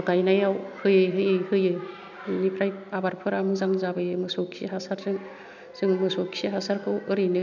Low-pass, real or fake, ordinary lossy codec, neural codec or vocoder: 7.2 kHz; real; none; none